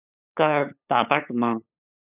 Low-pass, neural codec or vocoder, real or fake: 3.6 kHz; codec, 16 kHz, 8 kbps, FunCodec, trained on LibriTTS, 25 frames a second; fake